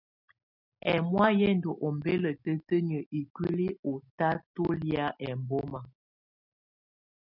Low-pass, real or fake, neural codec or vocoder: 5.4 kHz; real; none